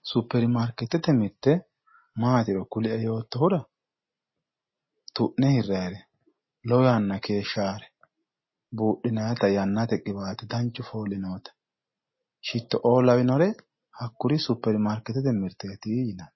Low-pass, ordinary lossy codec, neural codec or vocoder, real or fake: 7.2 kHz; MP3, 24 kbps; none; real